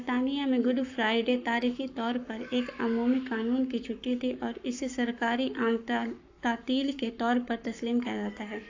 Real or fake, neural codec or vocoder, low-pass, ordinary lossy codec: fake; codec, 44.1 kHz, 7.8 kbps, Pupu-Codec; 7.2 kHz; AAC, 48 kbps